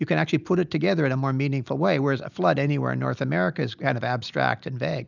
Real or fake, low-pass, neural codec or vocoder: real; 7.2 kHz; none